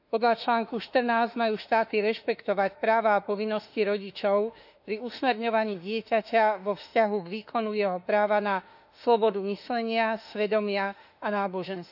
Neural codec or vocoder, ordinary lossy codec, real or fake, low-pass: autoencoder, 48 kHz, 32 numbers a frame, DAC-VAE, trained on Japanese speech; none; fake; 5.4 kHz